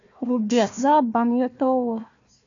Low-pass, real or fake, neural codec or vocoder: 7.2 kHz; fake; codec, 16 kHz, 1 kbps, FunCodec, trained on Chinese and English, 50 frames a second